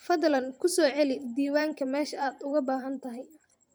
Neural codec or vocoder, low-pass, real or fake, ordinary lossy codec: vocoder, 44.1 kHz, 128 mel bands every 512 samples, BigVGAN v2; none; fake; none